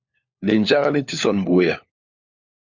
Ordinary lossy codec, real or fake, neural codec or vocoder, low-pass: Opus, 64 kbps; fake; codec, 16 kHz, 4 kbps, FunCodec, trained on LibriTTS, 50 frames a second; 7.2 kHz